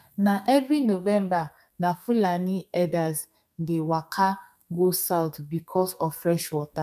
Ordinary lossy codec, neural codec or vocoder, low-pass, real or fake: none; codec, 32 kHz, 1.9 kbps, SNAC; 14.4 kHz; fake